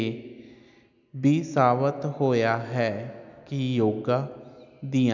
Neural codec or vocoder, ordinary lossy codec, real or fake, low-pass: none; none; real; 7.2 kHz